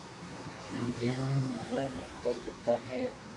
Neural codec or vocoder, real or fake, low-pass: codec, 24 kHz, 1 kbps, SNAC; fake; 10.8 kHz